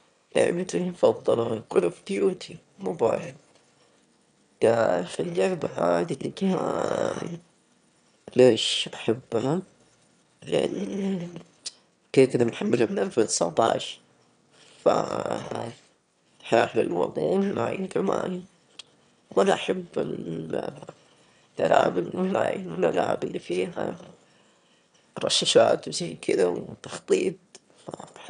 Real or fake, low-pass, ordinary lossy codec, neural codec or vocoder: fake; 9.9 kHz; none; autoencoder, 22.05 kHz, a latent of 192 numbers a frame, VITS, trained on one speaker